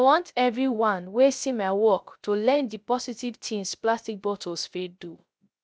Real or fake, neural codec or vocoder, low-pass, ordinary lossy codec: fake; codec, 16 kHz, 0.3 kbps, FocalCodec; none; none